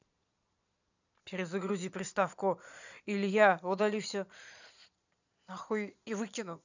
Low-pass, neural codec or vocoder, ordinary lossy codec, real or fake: 7.2 kHz; vocoder, 22.05 kHz, 80 mel bands, Vocos; none; fake